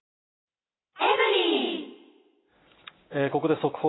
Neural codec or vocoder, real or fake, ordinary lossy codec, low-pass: none; real; AAC, 16 kbps; 7.2 kHz